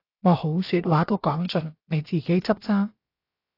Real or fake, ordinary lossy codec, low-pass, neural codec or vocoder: fake; AAC, 32 kbps; 5.4 kHz; codec, 16 kHz, about 1 kbps, DyCAST, with the encoder's durations